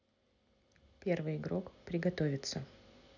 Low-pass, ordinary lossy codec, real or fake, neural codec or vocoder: 7.2 kHz; none; real; none